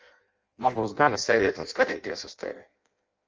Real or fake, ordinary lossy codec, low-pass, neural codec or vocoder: fake; Opus, 24 kbps; 7.2 kHz; codec, 16 kHz in and 24 kHz out, 0.6 kbps, FireRedTTS-2 codec